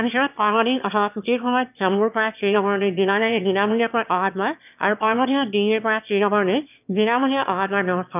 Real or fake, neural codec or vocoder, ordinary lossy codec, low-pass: fake; autoencoder, 22.05 kHz, a latent of 192 numbers a frame, VITS, trained on one speaker; none; 3.6 kHz